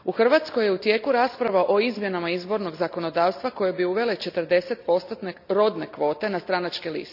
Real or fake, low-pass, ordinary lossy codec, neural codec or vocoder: real; 5.4 kHz; none; none